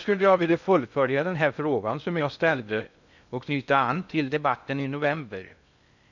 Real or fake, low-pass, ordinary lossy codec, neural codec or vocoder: fake; 7.2 kHz; none; codec, 16 kHz in and 24 kHz out, 0.6 kbps, FocalCodec, streaming, 2048 codes